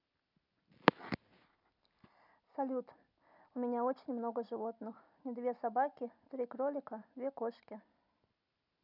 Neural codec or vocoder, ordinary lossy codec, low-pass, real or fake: none; none; 5.4 kHz; real